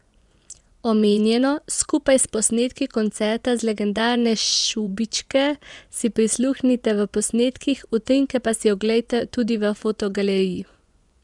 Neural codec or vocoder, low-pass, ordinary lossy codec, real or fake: vocoder, 24 kHz, 100 mel bands, Vocos; 10.8 kHz; none; fake